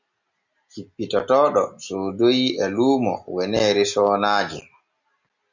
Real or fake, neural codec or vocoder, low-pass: real; none; 7.2 kHz